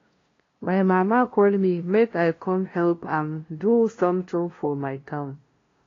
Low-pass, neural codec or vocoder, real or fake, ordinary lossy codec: 7.2 kHz; codec, 16 kHz, 0.5 kbps, FunCodec, trained on LibriTTS, 25 frames a second; fake; AAC, 32 kbps